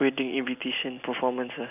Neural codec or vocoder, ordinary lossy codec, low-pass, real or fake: none; none; 3.6 kHz; real